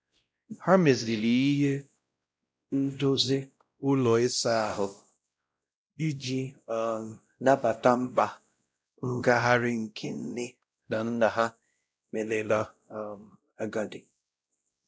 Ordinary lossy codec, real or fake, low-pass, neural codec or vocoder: none; fake; none; codec, 16 kHz, 0.5 kbps, X-Codec, WavLM features, trained on Multilingual LibriSpeech